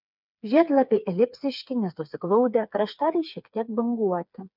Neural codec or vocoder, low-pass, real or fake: codec, 16 kHz, 4 kbps, FreqCodec, smaller model; 5.4 kHz; fake